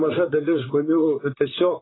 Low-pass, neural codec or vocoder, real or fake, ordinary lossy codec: 7.2 kHz; vocoder, 44.1 kHz, 128 mel bands, Pupu-Vocoder; fake; AAC, 16 kbps